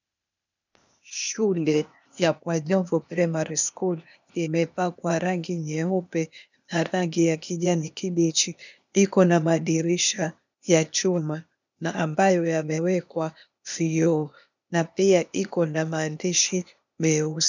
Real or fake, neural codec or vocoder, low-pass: fake; codec, 16 kHz, 0.8 kbps, ZipCodec; 7.2 kHz